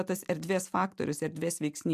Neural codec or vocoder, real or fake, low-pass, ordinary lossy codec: vocoder, 44.1 kHz, 128 mel bands every 256 samples, BigVGAN v2; fake; 14.4 kHz; AAC, 96 kbps